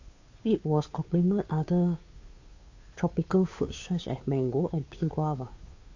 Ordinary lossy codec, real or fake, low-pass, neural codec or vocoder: AAC, 48 kbps; fake; 7.2 kHz; codec, 16 kHz, 2 kbps, FunCodec, trained on Chinese and English, 25 frames a second